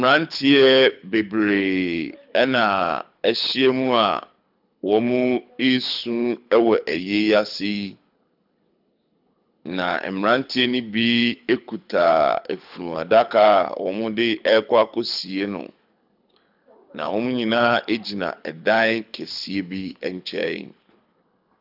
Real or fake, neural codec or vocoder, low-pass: fake; codec, 24 kHz, 6 kbps, HILCodec; 5.4 kHz